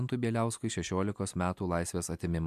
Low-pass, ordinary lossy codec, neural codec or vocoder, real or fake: 14.4 kHz; AAC, 96 kbps; none; real